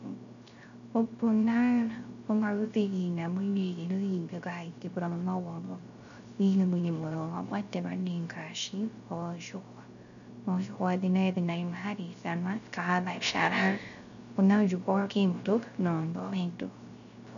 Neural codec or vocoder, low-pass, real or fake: codec, 16 kHz, 0.3 kbps, FocalCodec; 7.2 kHz; fake